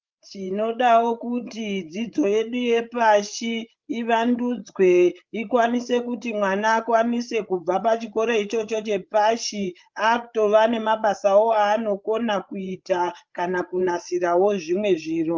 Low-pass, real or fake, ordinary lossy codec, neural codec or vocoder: 7.2 kHz; fake; Opus, 24 kbps; codec, 16 kHz, 8 kbps, FreqCodec, larger model